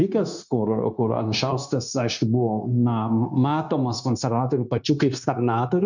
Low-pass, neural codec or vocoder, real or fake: 7.2 kHz; codec, 16 kHz, 0.9 kbps, LongCat-Audio-Codec; fake